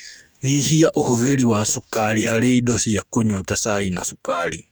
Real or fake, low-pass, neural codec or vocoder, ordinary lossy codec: fake; none; codec, 44.1 kHz, 2.6 kbps, DAC; none